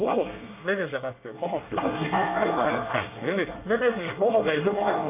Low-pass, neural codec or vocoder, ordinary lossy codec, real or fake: 3.6 kHz; codec, 24 kHz, 1 kbps, SNAC; MP3, 32 kbps; fake